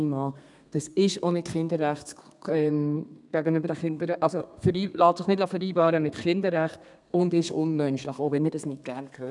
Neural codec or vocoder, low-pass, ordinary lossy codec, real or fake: codec, 32 kHz, 1.9 kbps, SNAC; 10.8 kHz; none; fake